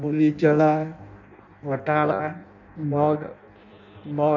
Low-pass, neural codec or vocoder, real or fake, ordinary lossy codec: 7.2 kHz; codec, 16 kHz in and 24 kHz out, 0.6 kbps, FireRedTTS-2 codec; fake; none